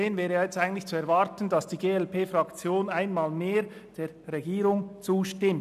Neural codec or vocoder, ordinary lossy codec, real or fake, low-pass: none; none; real; 14.4 kHz